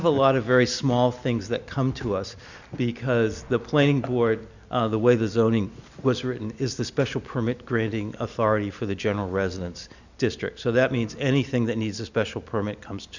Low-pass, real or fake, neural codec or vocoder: 7.2 kHz; real; none